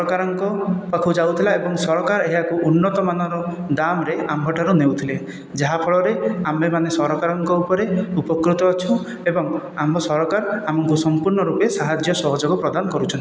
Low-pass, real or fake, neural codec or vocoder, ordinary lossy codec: none; real; none; none